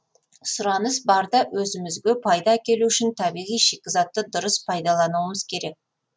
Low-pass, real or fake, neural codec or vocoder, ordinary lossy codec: none; real; none; none